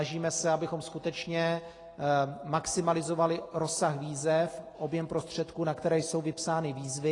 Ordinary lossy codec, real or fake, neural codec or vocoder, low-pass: AAC, 32 kbps; real; none; 10.8 kHz